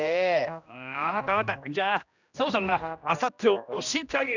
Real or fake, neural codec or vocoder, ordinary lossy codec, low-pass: fake; codec, 16 kHz, 1 kbps, X-Codec, HuBERT features, trained on general audio; none; 7.2 kHz